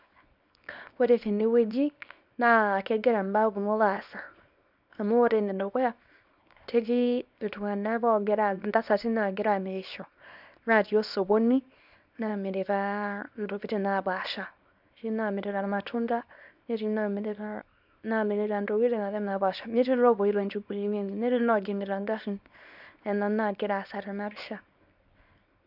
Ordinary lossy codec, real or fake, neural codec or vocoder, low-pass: none; fake; codec, 24 kHz, 0.9 kbps, WavTokenizer, small release; 5.4 kHz